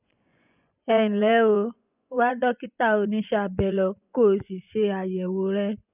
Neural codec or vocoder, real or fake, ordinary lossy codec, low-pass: vocoder, 24 kHz, 100 mel bands, Vocos; fake; none; 3.6 kHz